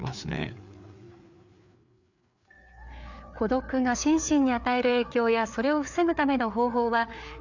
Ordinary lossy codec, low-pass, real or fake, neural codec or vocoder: none; 7.2 kHz; fake; codec, 16 kHz, 4 kbps, FreqCodec, larger model